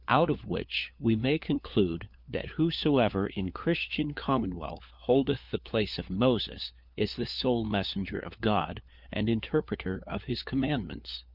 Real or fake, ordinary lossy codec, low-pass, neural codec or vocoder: fake; Opus, 64 kbps; 5.4 kHz; codec, 16 kHz, 4 kbps, FunCodec, trained on LibriTTS, 50 frames a second